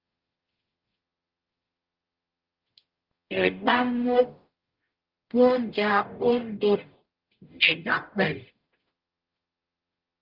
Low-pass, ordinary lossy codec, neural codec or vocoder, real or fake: 5.4 kHz; Opus, 24 kbps; codec, 44.1 kHz, 0.9 kbps, DAC; fake